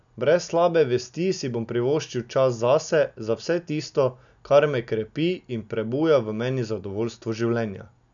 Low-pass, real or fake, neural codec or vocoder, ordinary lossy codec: 7.2 kHz; real; none; none